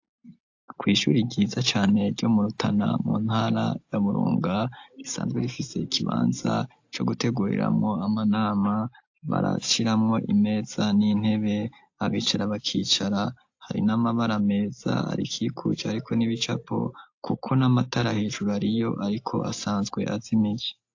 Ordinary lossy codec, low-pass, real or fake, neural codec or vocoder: AAC, 48 kbps; 7.2 kHz; real; none